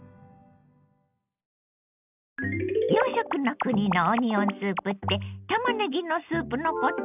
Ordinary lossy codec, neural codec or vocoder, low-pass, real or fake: none; none; 3.6 kHz; real